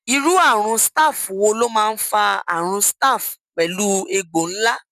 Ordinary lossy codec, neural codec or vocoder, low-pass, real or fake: none; none; 14.4 kHz; real